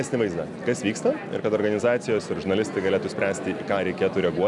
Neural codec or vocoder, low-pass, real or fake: none; 10.8 kHz; real